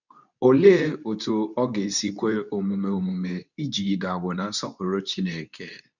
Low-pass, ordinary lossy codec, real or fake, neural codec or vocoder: 7.2 kHz; none; fake; codec, 24 kHz, 0.9 kbps, WavTokenizer, medium speech release version 2